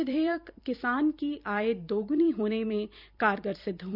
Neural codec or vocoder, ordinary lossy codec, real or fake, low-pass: vocoder, 44.1 kHz, 128 mel bands every 256 samples, BigVGAN v2; none; fake; 5.4 kHz